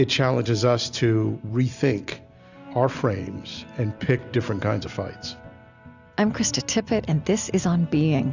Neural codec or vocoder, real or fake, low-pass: none; real; 7.2 kHz